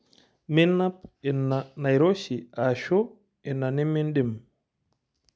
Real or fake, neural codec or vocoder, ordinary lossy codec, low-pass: real; none; none; none